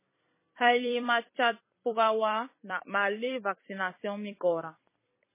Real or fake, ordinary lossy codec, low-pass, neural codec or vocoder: fake; MP3, 16 kbps; 3.6 kHz; vocoder, 44.1 kHz, 128 mel bands every 256 samples, BigVGAN v2